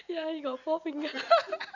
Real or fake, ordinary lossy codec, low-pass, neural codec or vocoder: real; none; 7.2 kHz; none